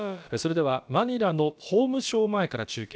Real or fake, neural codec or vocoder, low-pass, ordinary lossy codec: fake; codec, 16 kHz, about 1 kbps, DyCAST, with the encoder's durations; none; none